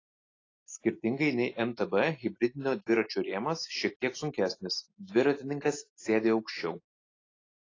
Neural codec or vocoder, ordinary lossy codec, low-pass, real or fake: none; AAC, 32 kbps; 7.2 kHz; real